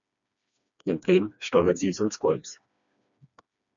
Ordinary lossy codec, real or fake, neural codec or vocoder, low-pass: MP3, 96 kbps; fake; codec, 16 kHz, 2 kbps, FreqCodec, smaller model; 7.2 kHz